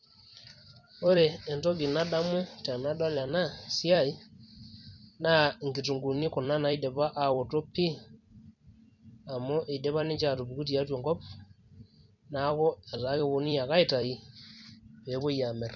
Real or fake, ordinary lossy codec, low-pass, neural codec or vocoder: fake; none; 7.2 kHz; vocoder, 44.1 kHz, 128 mel bands every 256 samples, BigVGAN v2